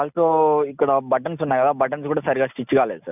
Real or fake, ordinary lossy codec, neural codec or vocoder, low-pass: real; none; none; 3.6 kHz